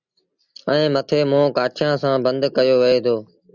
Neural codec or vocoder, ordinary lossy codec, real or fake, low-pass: none; Opus, 64 kbps; real; 7.2 kHz